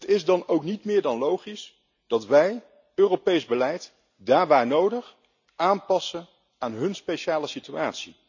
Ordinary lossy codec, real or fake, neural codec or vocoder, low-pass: none; real; none; 7.2 kHz